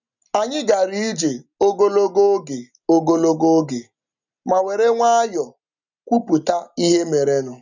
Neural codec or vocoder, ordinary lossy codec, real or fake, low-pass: none; none; real; 7.2 kHz